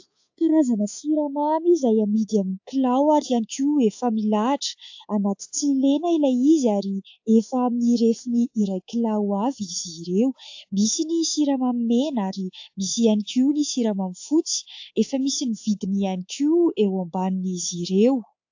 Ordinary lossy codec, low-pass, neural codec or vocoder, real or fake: AAC, 48 kbps; 7.2 kHz; codec, 24 kHz, 3.1 kbps, DualCodec; fake